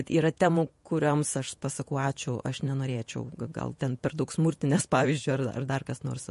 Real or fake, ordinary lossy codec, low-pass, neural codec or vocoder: fake; MP3, 48 kbps; 14.4 kHz; vocoder, 48 kHz, 128 mel bands, Vocos